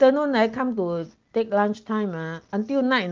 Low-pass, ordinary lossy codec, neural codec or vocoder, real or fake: 7.2 kHz; Opus, 32 kbps; none; real